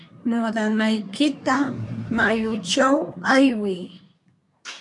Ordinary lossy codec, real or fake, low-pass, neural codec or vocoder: MP3, 96 kbps; fake; 10.8 kHz; codec, 24 kHz, 1 kbps, SNAC